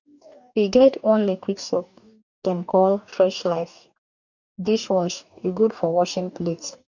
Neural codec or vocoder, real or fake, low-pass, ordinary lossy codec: codec, 44.1 kHz, 2.6 kbps, DAC; fake; 7.2 kHz; none